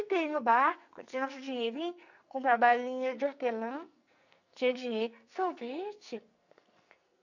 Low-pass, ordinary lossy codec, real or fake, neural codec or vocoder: 7.2 kHz; none; fake; codec, 44.1 kHz, 2.6 kbps, SNAC